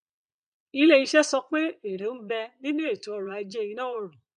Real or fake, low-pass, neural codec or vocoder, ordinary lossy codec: fake; 9.9 kHz; vocoder, 22.05 kHz, 80 mel bands, Vocos; MP3, 96 kbps